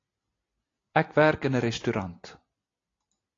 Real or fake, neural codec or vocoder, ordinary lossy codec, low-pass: real; none; AAC, 32 kbps; 7.2 kHz